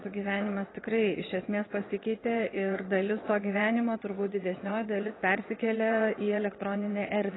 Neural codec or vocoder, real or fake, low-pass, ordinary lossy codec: vocoder, 22.05 kHz, 80 mel bands, WaveNeXt; fake; 7.2 kHz; AAC, 16 kbps